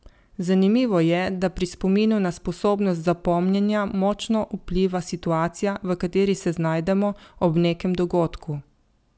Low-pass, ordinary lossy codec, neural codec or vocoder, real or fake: none; none; none; real